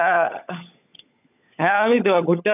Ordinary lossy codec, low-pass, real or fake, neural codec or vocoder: none; 3.6 kHz; fake; codec, 16 kHz, 16 kbps, FunCodec, trained on LibriTTS, 50 frames a second